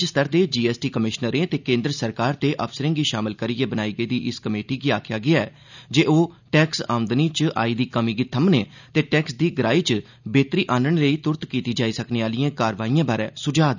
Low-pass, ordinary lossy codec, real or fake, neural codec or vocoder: 7.2 kHz; none; real; none